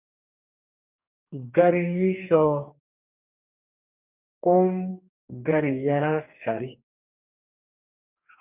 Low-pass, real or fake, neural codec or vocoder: 3.6 kHz; fake; codec, 44.1 kHz, 2.6 kbps, DAC